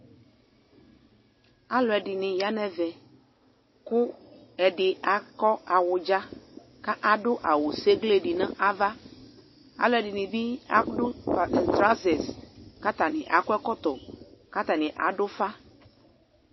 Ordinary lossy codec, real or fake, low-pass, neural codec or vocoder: MP3, 24 kbps; real; 7.2 kHz; none